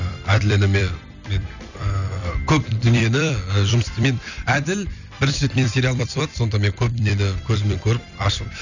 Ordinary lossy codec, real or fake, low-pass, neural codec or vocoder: none; fake; 7.2 kHz; vocoder, 44.1 kHz, 128 mel bands every 256 samples, BigVGAN v2